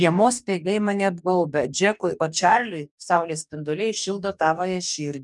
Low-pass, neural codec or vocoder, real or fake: 10.8 kHz; codec, 44.1 kHz, 2.6 kbps, DAC; fake